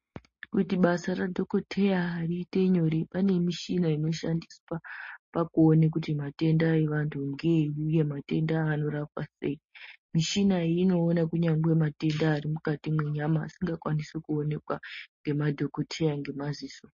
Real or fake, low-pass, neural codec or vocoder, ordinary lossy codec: real; 7.2 kHz; none; MP3, 32 kbps